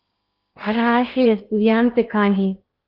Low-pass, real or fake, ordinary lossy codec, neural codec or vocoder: 5.4 kHz; fake; Opus, 24 kbps; codec, 16 kHz in and 24 kHz out, 0.8 kbps, FocalCodec, streaming, 65536 codes